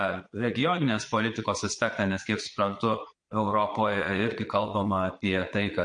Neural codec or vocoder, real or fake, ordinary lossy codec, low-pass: vocoder, 22.05 kHz, 80 mel bands, Vocos; fake; MP3, 48 kbps; 9.9 kHz